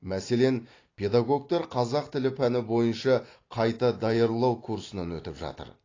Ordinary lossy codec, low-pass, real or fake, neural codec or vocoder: AAC, 32 kbps; 7.2 kHz; real; none